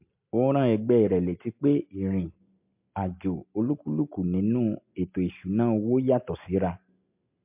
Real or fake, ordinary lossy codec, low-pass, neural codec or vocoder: real; MP3, 32 kbps; 3.6 kHz; none